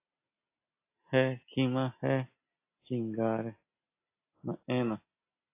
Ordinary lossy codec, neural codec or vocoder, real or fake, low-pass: AAC, 24 kbps; none; real; 3.6 kHz